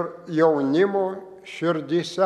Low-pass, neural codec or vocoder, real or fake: 14.4 kHz; none; real